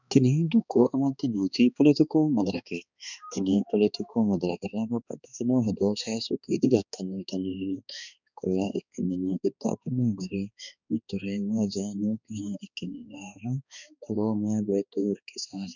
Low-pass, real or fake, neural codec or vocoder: 7.2 kHz; fake; codec, 16 kHz, 2 kbps, X-Codec, HuBERT features, trained on balanced general audio